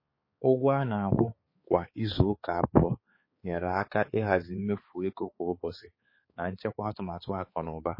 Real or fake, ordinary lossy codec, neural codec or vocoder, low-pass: fake; MP3, 24 kbps; codec, 16 kHz, 4 kbps, X-Codec, HuBERT features, trained on balanced general audio; 5.4 kHz